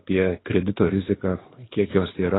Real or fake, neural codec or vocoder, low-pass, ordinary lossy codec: fake; codec, 24 kHz, 6 kbps, HILCodec; 7.2 kHz; AAC, 16 kbps